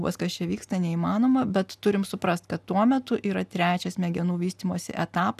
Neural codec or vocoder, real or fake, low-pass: none; real; 14.4 kHz